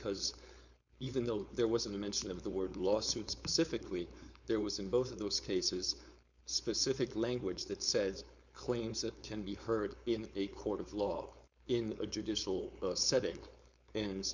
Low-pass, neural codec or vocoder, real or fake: 7.2 kHz; codec, 16 kHz, 4.8 kbps, FACodec; fake